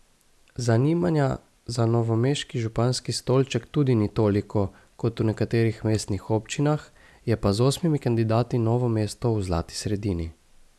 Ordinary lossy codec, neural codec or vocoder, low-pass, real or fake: none; none; none; real